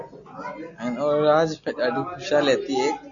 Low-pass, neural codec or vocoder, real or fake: 7.2 kHz; none; real